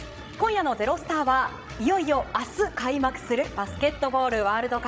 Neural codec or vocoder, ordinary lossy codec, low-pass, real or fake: codec, 16 kHz, 16 kbps, FreqCodec, larger model; none; none; fake